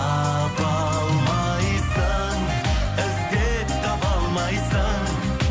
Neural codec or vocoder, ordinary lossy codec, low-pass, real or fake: none; none; none; real